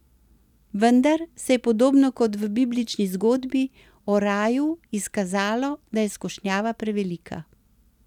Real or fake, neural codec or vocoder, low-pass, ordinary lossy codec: real; none; 19.8 kHz; none